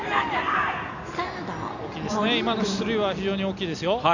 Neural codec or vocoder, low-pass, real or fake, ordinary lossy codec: none; 7.2 kHz; real; none